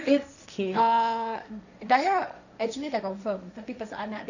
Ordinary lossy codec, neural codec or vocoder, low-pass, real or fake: none; codec, 16 kHz, 1.1 kbps, Voila-Tokenizer; 7.2 kHz; fake